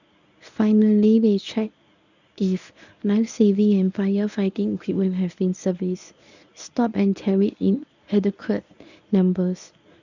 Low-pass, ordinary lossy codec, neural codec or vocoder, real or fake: 7.2 kHz; none; codec, 24 kHz, 0.9 kbps, WavTokenizer, medium speech release version 1; fake